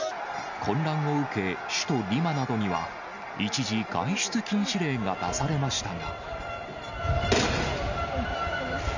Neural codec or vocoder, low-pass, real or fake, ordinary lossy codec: none; 7.2 kHz; real; none